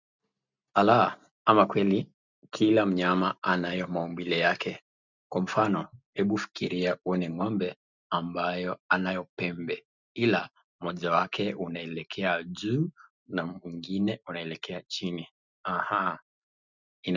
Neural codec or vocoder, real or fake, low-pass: none; real; 7.2 kHz